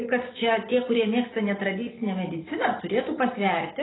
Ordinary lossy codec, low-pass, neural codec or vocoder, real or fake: AAC, 16 kbps; 7.2 kHz; none; real